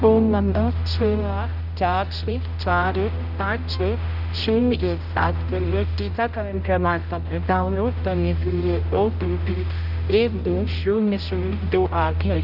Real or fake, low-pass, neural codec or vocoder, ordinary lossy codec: fake; 5.4 kHz; codec, 16 kHz, 0.5 kbps, X-Codec, HuBERT features, trained on general audio; none